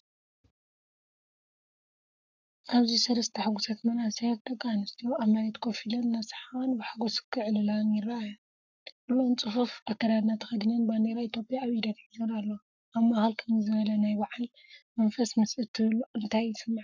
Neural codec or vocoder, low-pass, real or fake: codec, 44.1 kHz, 7.8 kbps, Pupu-Codec; 7.2 kHz; fake